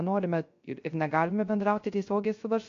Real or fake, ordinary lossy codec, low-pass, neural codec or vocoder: fake; MP3, 48 kbps; 7.2 kHz; codec, 16 kHz, 0.3 kbps, FocalCodec